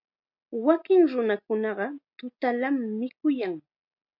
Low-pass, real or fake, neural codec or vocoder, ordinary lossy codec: 5.4 kHz; real; none; MP3, 48 kbps